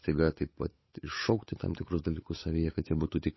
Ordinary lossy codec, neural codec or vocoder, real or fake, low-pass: MP3, 24 kbps; codec, 16 kHz, 8 kbps, FunCodec, trained on Chinese and English, 25 frames a second; fake; 7.2 kHz